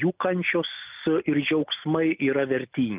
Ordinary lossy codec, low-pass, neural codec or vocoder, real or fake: Opus, 24 kbps; 3.6 kHz; none; real